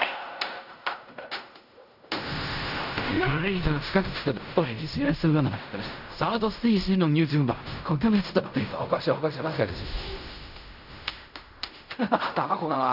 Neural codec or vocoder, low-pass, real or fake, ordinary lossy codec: codec, 16 kHz in and 24 kHz out, 0.4 kbps, LongCat-Audio-Codec, fine tuned four codebook decoder; 5.4 kHz; fake; none